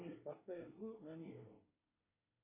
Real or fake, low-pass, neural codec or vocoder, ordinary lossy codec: fake; 3.6 kHz; codec, 24 kHz, 1 kbps, SNAC; AAC, 24 kbps